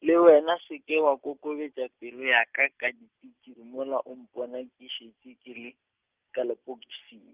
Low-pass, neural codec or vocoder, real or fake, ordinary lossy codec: 3.6 kHz; none; real; Opus, 32 kbps